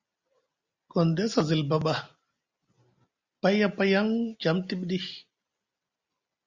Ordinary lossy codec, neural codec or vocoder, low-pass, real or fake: Opus, 64 kbps; none; 7.2 kHz; real